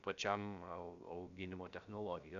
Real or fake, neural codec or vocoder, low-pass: fake; codec, 16 kHz, about 1 kbps, DyCAST, with the encoder's durations; 7.2 kHz